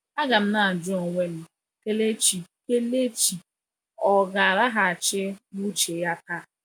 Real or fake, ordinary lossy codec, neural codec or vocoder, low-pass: real; none; none; none